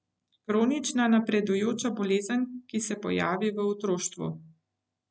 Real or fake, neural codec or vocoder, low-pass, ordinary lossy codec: real; none; none; none